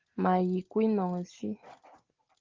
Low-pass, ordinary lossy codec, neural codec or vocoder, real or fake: 7.2 kHz; Opus, 16 kbps; none; real